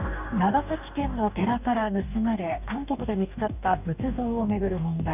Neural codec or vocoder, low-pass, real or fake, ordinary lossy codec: codec, 44.1 kHz, 2.6 kbps, DAC; 3.6 kHz; fake; none